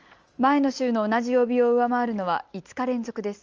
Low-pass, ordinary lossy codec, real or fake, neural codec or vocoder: 7.2 kHz; Opus, 24 kbps; real; none